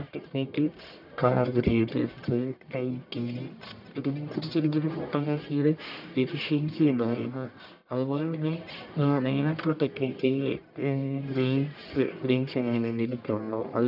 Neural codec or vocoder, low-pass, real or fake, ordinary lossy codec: codec, 44.1 kHz, 1.7 kbps, Pupu-Codec; 5.4 kHz; fake; none